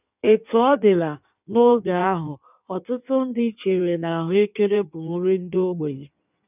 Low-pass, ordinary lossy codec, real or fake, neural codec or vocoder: 3.6 kHz; none; fake; codec, 16 kHz in and 24 kHz out, 1.1 kbps, FireRedTTS-2 codec